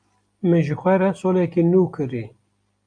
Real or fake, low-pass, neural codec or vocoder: real; 9.9 kHz; none